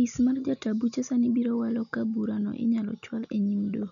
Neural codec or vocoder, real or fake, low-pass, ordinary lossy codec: none; real; 7.2 kHz; MP3, 96 kbps